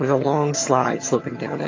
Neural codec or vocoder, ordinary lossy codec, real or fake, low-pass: vocoder, 22.05 kHz, 80 mel bands, HiFi-GAN; AAC, 32 kbps; fake; 7.2 kHz